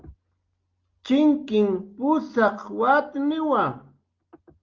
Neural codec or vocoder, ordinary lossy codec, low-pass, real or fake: none; Opus, 32 kbps; 7.2 kHz; real